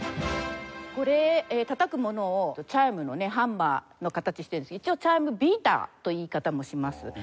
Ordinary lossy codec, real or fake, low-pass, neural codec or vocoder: none; real; none; none